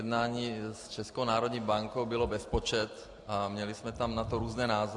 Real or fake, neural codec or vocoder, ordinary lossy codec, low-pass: real; none; AAC, 64 kbps; 10.8 kHz